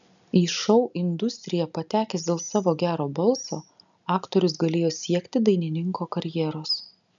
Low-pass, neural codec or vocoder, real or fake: 7.2 kHz; none; real